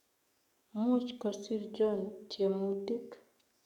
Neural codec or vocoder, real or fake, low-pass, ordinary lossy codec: codec, 44.1 kHz, 7.8 kbps, DAC; fake; 19.8 kHz; none